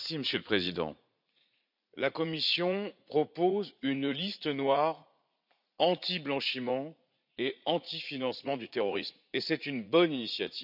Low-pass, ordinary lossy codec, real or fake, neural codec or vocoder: 5.4 kHz; none; fake; vocoder, 44.1 kHz, 80 mel bands, Vocos